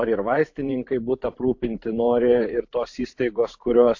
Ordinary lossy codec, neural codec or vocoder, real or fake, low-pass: AAC, 48 kbps; vocoder, 44.1 kHz, 128 mel bands every 512 samples, BigVGAN v2; fake; 7.2 kHz